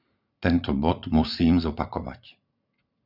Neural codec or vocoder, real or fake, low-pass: vocoder, 44.1 kHz, 80 mel bands, Vocos; fake; 5.4 kHz